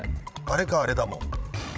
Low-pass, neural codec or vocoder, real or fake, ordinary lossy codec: none; codec, 16 kHz, 16 kbps, FreqCodec, larger model; fake; none